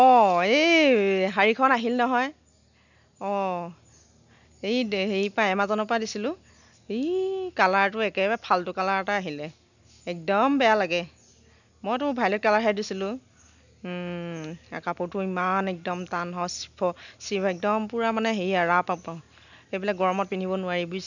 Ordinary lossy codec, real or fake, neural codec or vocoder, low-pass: none; real; none; 7.2 kHz